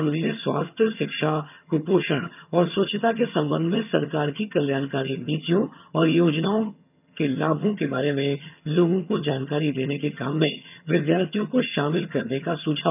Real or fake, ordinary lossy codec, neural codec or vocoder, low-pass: fake; none; vocoder, 22.05 kHz, 80 mel bands, HiFi-GAN; 3.6 kHz